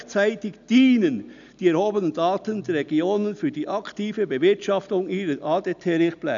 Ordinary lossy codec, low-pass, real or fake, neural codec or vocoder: none; 7.2 kHz; real; none